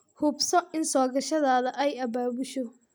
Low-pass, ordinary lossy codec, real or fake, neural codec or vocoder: none; none; real; none